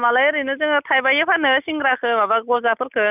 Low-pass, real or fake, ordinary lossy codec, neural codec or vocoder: 3.6 kHz; real; none; none